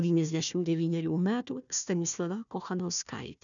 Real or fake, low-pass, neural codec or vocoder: fake; 7.2 kHz; codec, 16 kHz, 1 kbps, FunCodec, trained on Chinese and English, 50 frames a second